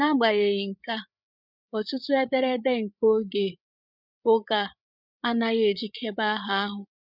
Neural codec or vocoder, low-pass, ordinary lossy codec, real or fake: codec, 16 kHz, 8 kbps, FreqCodec, larger model; 5.4 kHz; none; fake